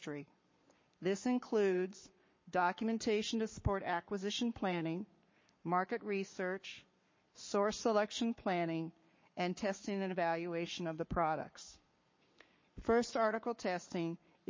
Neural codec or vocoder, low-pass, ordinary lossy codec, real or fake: codec, 44.1 kHz, 7.8 kbps, Pupu-Codec; 7.2 kHz; MP3, 32 kbps; fake